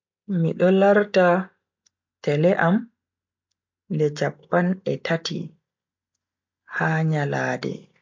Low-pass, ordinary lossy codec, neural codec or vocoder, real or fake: 7.2 kHz; MP3, 48 kbps; none; real